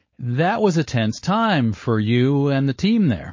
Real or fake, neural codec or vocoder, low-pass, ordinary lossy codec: fake; codec, 16 kHz, 4.8 kbps, FACodec; 7.2 kHz; MP3, 32 kbps